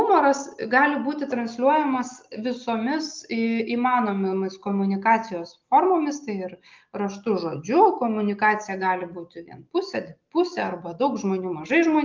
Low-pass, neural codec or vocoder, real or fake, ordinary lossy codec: 7.2 kHz; none; real; Opus, 24 kbps